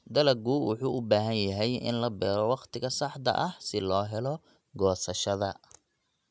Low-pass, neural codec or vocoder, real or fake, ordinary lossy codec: none; none; real; none